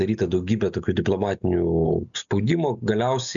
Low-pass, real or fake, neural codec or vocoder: 7.2 kHz; real; none